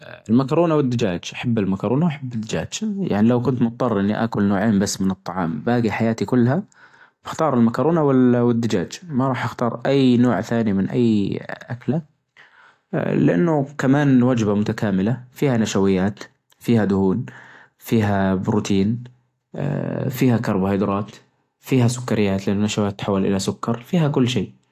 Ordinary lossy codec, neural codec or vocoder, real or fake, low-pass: AAC, 64 kbps; none; real; 14.4 kHz